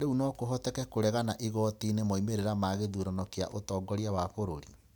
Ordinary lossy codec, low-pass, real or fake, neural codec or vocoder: none; none; real; none